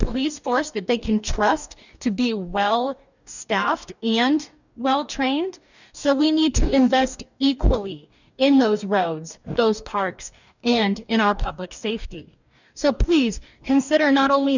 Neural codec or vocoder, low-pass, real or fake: codec, 44.1 kHz, 2.6 kbps, DAC; 7.2 kHz; fake